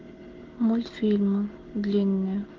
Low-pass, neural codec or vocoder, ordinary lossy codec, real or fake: 7.2 kHz; none; Opus, 16 kbps; real